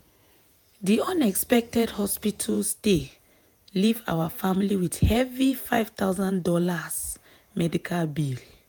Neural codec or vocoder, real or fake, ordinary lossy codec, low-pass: vocoder, 48 kHz, 128 mel bands, Vocos; fake; none; none